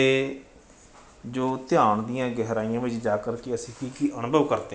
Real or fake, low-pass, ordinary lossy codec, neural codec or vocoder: real; none; none; none